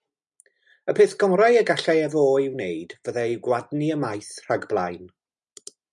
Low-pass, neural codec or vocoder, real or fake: 10.8 kHz; none; real